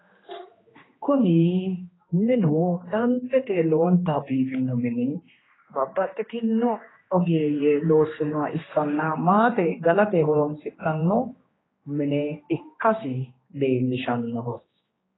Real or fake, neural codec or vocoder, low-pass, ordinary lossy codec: fake; codec, 16 kHz, 2 kbps, X-Codec, HuBERT features, trained on general audio; 7.2 kHz; AAC, 16 kbps